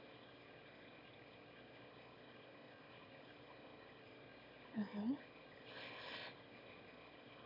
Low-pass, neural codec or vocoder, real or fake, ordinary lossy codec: 5.4 kHz; autoencoder, 22.05 kHz, a latent of 192 numbers a frame, VITS, trained on one speaker; fake; MP3, 48 kbps